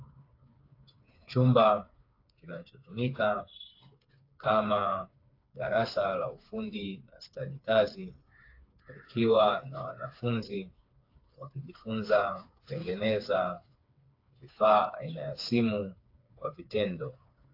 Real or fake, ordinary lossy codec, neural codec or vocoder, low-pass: fake; AAC, 32 kbps; codec, 16 kHz, 4 kbps, FreqCodec, smaller model; 5.4 kHz